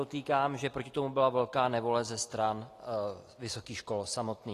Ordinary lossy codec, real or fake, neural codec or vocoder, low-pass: AAC, 48 kbps; real; none; 14.4 kHz